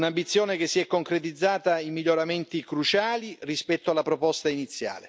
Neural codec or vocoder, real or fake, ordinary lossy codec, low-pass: none; real; none; none